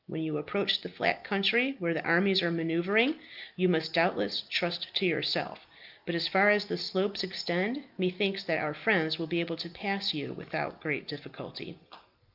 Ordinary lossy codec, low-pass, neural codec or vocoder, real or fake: Opus, 24 kbps; 5.4 kHz; none; real